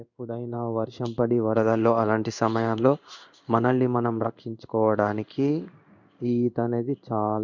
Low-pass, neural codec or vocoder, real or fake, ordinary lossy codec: 7.2 kHz; codec, 16 kHz in and 24 kHz out, 1 kbps, XY-Tokenizer; fake; none